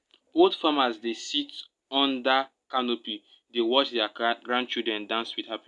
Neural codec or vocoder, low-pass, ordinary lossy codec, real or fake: none; 10.8 kHz; none; real